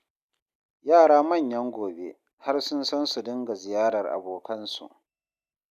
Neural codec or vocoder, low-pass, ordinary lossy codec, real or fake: none; 14.4 kHz; none; real